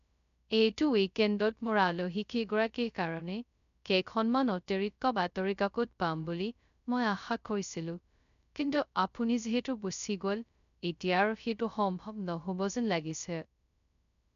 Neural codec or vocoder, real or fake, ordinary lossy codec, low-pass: codec, 16 kHz, 0.2 kbps, FocalCodec; fake; none; 7.2 kHz